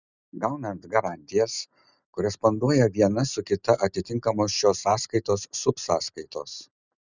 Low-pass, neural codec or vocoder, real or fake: 7.2 kHz; none; real